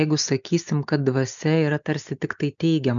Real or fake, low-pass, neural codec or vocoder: real; 7.2 kHz; none